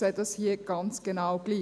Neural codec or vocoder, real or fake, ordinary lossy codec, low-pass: none; real; none; none